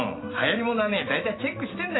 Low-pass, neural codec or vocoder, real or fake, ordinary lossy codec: 7.2 kHz; none; real; AAC, 16 kbps